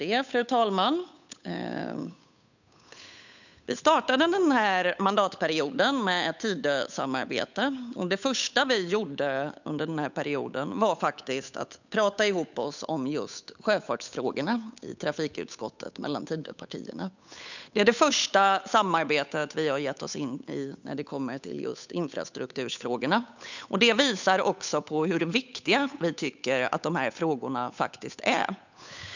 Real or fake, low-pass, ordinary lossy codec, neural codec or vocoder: fake; 7.2 kHz; none; codec, 16 kHz, 8 kbps, FunCodec, trained on Chinese and English, 25 frames a second